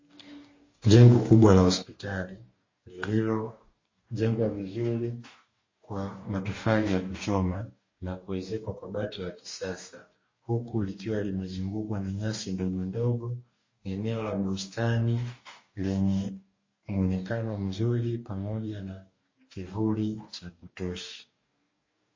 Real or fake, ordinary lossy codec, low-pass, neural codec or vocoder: fake; MP3, 32 kbps; 7.2 kHz; codec, 44.1 kHz, 2.6 kbps, DAC